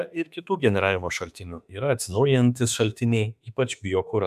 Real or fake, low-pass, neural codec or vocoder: fake; 14.4 kHz; autoencoder, 48 kHz, 32 numbers a frame, DAC-VAE, trained on Japanese speech